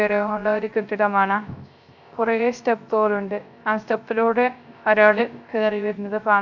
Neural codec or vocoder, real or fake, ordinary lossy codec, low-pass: codec, 16 kHz, 0.3 kbps, FocalCodec; fake; none; 7.2 kHz